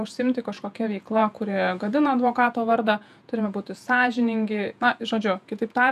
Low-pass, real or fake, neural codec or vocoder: 14.4 kHz; fake; vocoder, 48 kHz, 128 mel bands, Vocos